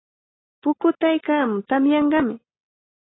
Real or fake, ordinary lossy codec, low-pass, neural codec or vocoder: real; AAC, 16 kbps; 7.2 kHz; none